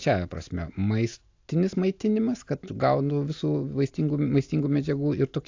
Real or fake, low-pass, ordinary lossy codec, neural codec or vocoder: real; 7.2 kHz; AAC, 48 kbps; none